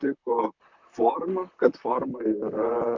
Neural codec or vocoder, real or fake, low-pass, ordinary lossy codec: vocoder, 44.1 kHz, 128 mel bands, Pupu-Vocoder; fake; 7.2 kHz; MP3, 64 kbps